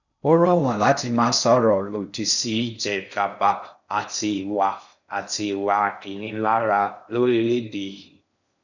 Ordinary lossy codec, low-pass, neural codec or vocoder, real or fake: none; 7.2 kHz; codec, 16 kHz in and 24 kHz out, 0.6 kbps, FocalCodec, streaming, 4096 codes; fake